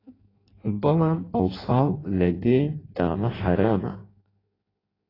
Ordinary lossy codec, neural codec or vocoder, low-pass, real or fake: AAC, 24 kbps; codec, 16 kHz in and 24 kHz out, 0.6 kbps, FireRedTTS-2 codec; 5.4 kHz; fake